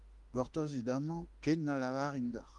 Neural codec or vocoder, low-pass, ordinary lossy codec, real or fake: autoencoder, 48 kHz, 32 numbers a frame, DAC-VAE, trained on Japanese speech; 10.8 kHz; Opus, 24 kbps; fake